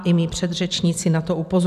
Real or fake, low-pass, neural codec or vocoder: real; 14.4 kHz; none